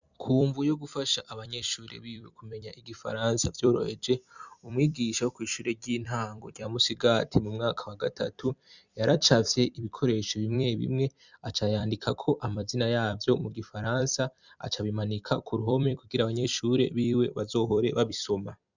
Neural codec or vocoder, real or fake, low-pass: vocoder, 44.1 kHz, 128 mel bands every 256 samples, BigVGAN v2; fake; 7.2 kHz